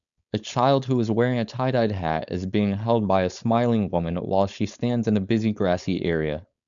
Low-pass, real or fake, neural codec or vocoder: 7.2 kHz; fake; codec, 16 kHz, 4.8 kbps, FACodec